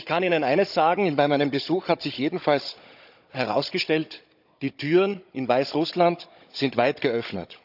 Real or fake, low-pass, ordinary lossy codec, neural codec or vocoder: fake; 5.4 kHz; none; codec, 16 kHz, 16 kbps, FunCodec, trained on Chinese and English, 50 frames a second